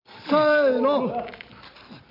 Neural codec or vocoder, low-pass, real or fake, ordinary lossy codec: none; 5.4 kHz; real; none